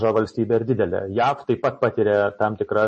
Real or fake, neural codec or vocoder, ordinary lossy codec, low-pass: real; none; MP3, 32 kbps; 9.9 kHz